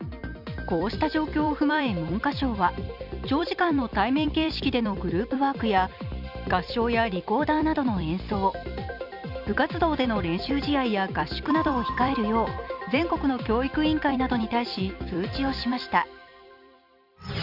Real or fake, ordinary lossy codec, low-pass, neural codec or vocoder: fake; none; 5.4 kHz; vocoder, 44.1 kHz, 128 mel bands every 256 samples, BigVGAN v2